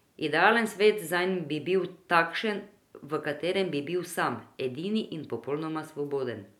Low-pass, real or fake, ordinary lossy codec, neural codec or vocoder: 19.8 kHz; real; none; none